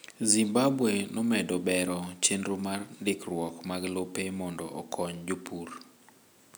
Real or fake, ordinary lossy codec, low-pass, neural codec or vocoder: real; none; none; none